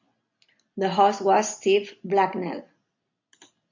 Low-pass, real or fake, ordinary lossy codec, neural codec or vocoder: 7.2 kHz; real; MP3, 48 kbps; none